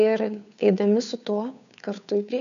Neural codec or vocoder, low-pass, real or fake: codec, 16 kHz, 2 kbps, FunCodec, trained on Chinese and English, 25 frames a second; 7.2 kHz; fake